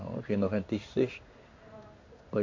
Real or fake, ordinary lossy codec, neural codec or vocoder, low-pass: fake; MP3, 48 kbps; codec, 16 kHz in and 24 kHz out, 1 kbps, XY-Tokenizer; 7.2 kHz